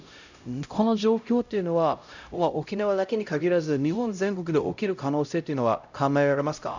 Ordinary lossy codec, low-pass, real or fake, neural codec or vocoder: none; 7.2 kHz; fake; codec, 16 kHz, 0.5 kbps, X-Codec, HuBERT features, trained on LibriSpeech